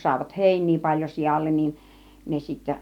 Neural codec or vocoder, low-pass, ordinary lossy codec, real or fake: none; 19.8 kHz; none; real